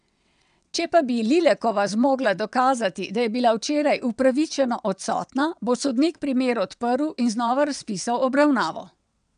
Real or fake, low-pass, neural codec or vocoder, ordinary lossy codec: fake; 9.9 kHz; vocoder, 22.05 kHz, 80 mel bands, WaveNeXt; none